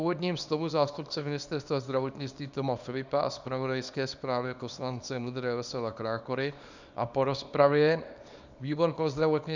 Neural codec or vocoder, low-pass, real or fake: codec, 24 kHz, 0.9 kbps, WavTokenizer, small release; 7.2 kHz; fake